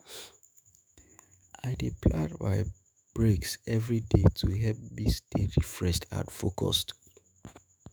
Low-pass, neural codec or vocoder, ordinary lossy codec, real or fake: none; autoencoder, 48 kHz, 128 numbers a frame, DAC-VAE, trained on Japanese speech; none; fake